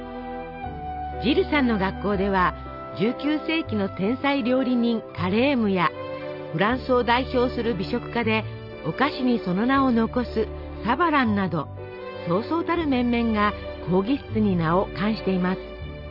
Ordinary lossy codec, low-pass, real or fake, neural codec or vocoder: none; 5.4 kHz; real; none